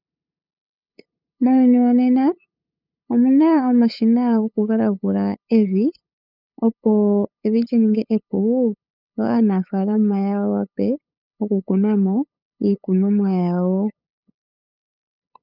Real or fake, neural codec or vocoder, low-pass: fake; codec, 16 kHz, 8 kbps, FunCodec, trained on LibriTTS, 25 frames a second; 5.4 kHz